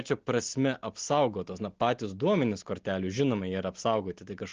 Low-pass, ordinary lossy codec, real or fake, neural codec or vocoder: 7.2 kHz; Opus, 16 kbps; real; none